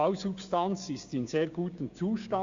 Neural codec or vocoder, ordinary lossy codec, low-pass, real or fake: codec, 16 kHz, 6 kbps, DAC; Opus, 64 kbps; 7.2 kHz; fake